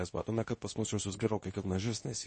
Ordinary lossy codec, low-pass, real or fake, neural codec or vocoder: MP3, 32 kbps; 10.8 kHz; fake; codec, 24 kHz, 0.9 kbps, WavTokenizer, medium speech release version 2